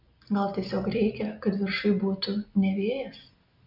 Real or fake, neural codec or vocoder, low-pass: real; none; 5.4 kHz